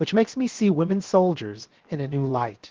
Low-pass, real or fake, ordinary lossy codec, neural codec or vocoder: 7.2 kHz; fake; Opus, 16 kbps; codec, 16 kHz, 0.7 kbps, FocalCodec